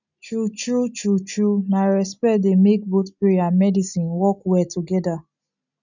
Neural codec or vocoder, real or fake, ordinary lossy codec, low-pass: none; real; none; 7.2 kHz